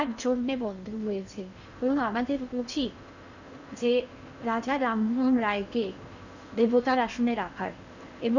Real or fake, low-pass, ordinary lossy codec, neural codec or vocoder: fake; 7.2 kHz; none; codec, 16 kHz in and 24 kHz out, 0.8 kbps, FocalCodec, streaming, 65536 codes